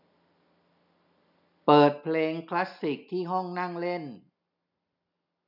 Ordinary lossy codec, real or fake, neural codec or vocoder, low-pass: none; real; none; 5.4 kHz